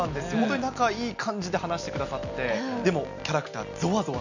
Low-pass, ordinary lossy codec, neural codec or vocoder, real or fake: 7.2 kHz; none; none; real